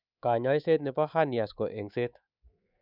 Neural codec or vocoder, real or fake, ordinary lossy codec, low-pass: codec, 24 kHz, 3.1 kbps, DualCodec; fake; none; 5.4 kHz